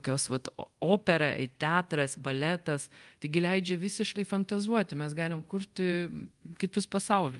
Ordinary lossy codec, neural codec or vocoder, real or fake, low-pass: Opus, 32 kbps; codec, 24 kHz, 0.5 kbps, DualCodec; fake; 10.8 kHz